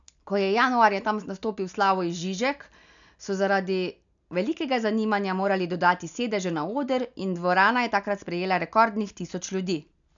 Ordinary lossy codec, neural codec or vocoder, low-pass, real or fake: none; none; 7.2 kHz; real